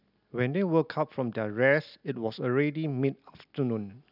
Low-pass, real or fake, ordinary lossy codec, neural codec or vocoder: 5.4 kHz; real; none; none